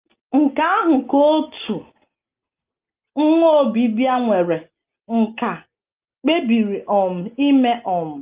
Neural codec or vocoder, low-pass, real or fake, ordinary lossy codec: none; 3.6 kHz; real; Opus, 32 kbps